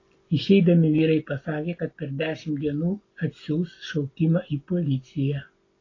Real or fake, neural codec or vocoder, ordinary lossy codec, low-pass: real; none; AAC, 32 kbps; 7.2 kHz